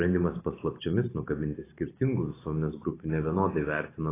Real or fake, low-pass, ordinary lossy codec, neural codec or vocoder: fake; 3.6 kHz; AAC, 16 kbps; vocoder, 44.1 kHz, 128 mel bands every 512 samples, BigVGAN v2